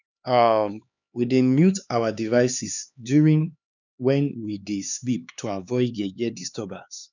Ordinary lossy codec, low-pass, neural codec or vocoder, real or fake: none; 7.2 kHz; codec, 16 kHz, 4 kbps, X-Codec, HuBERT features, trained on LibriSpeech; fake